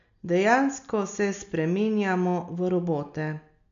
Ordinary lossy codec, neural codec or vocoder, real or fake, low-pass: none; none; real; 7.2 kHz